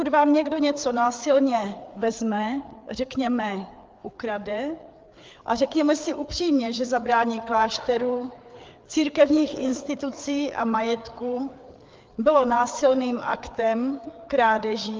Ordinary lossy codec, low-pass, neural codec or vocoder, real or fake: Opus, 24 kbps; 7.2 kHz; codec, 16 kHz, 4 kbps, FreqCodec, larger model; fake